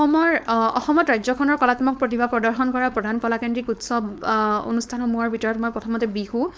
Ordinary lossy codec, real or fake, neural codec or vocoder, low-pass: none; fake; codec, 16 kHz, 4.8 kbps, FACodec; none